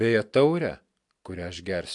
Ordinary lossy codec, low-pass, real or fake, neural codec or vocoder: AAC, 64 kbps; 10.8 kHz; real; none